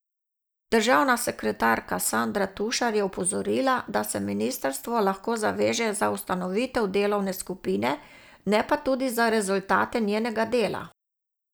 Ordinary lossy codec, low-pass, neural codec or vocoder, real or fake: none; none; none; real